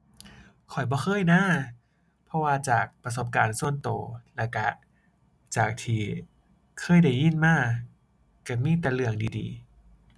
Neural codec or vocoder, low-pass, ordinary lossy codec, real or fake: none; none; none; real